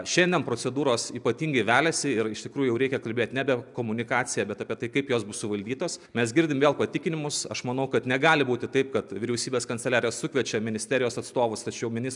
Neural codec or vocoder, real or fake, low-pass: none; real; 10.8 kHz